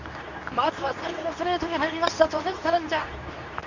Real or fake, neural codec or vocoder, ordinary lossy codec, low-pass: fake; codec, 24 kHz, 0.9 kbps, WavTokenizer, medium speech release version 2; none; 7.2 kHz